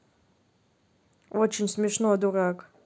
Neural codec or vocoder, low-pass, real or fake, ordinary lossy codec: none; none; real; none